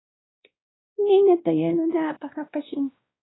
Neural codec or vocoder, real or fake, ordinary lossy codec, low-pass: codec, 16 kHz, 1 kbps, X-Codec, WavLM features, trained on Multilingual LibriSpeech; fake; AAC, 16 kbps; 7.2 kHz